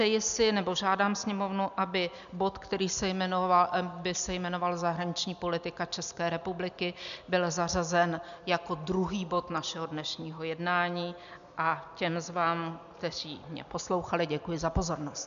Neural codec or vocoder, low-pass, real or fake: none; 7.2 kHz; real